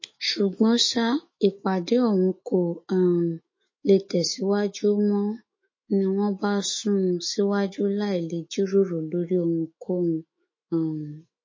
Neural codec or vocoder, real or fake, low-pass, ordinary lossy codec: autoencoder, 48 kHz, 128 numbers a frame, DAC-VAE, trained on Japanese speech; fake; 7.2 kHz; MP3, 32 kbps